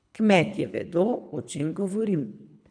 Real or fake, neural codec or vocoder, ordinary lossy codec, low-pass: fake; codec, 24 kHz, 3 kbps, HILCodec; none; 9.9 kHz